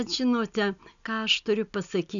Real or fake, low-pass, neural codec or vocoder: real; 7.2 kHz; none